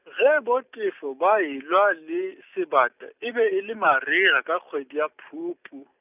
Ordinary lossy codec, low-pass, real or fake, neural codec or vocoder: none; 3.6 kHz; real; none